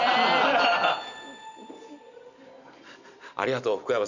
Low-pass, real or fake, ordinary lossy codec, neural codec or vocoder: 7.2 kHz; real; none; none